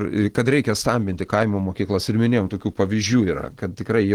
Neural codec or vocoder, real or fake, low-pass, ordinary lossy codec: none; real; 19.8 kHz; Opus, 16 kbps